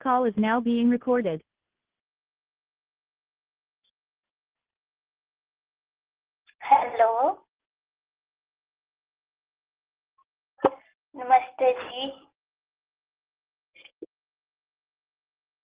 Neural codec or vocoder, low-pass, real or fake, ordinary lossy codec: none; 3.6 kHz; real; Opus, 16 kbps